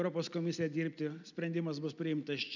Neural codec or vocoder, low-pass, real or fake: none; 7.2 kHz; real